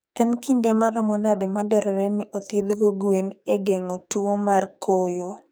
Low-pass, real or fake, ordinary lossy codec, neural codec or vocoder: none; fake; none; codec, 44.1 kHz, 2.6 kbps, SNAC